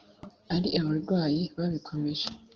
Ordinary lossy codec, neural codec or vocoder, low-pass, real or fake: Opus, 16 kbps; none; 7.2 kHz; real